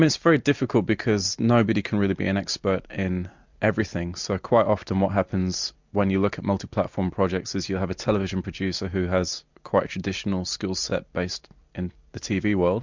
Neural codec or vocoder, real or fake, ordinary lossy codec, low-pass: none; real; MP3, 64 kbps; 7.2 kHz